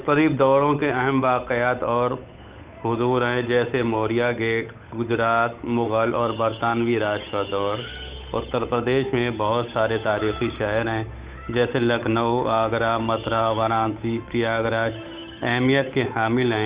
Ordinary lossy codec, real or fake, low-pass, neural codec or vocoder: Opus, 64 kbps; fake; 3.6 kHz; codec, 16 kHz, 8 kbps, FunCodec, trained on Chinese and English, 25 frames a second